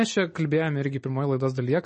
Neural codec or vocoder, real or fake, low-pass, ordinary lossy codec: none; real; 9.9 kHz; MP3, 32 kbps